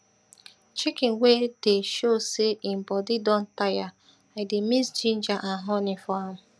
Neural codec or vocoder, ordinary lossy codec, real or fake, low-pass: none; none; real; none